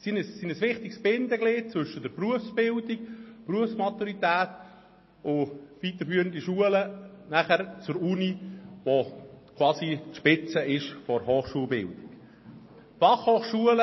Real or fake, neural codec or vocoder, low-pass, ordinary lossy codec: real; none; 7.2 kHz; MP3, 24 kbps